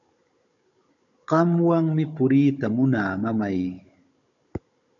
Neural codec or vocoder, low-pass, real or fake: codec, 16 kHz, 16 kbps, FunCodec, trained on Chinese and English, 50 frames a second; 7.2 kHz; fake